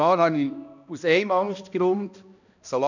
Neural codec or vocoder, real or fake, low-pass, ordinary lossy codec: codec, 16 kHz, 1 kbps, X-Codec, HuBERT features, trained on balanced general audio; fake; 7.2 kHz; none